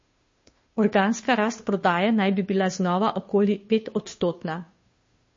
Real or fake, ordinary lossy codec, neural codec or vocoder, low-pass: fake; MP3, 32 kbps; codec, 16 kHz, 2 kbps, FunCodec, trained on Chinese and English, 25 frames a second; 7.2 kHz